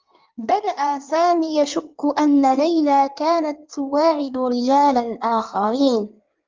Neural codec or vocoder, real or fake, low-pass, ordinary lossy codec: codec, 16 kHz in and 24 kHz out, 1.1 kbps, FireRedTTS-2 codec; fake; 7.2 kHz; Opus, 24 kbps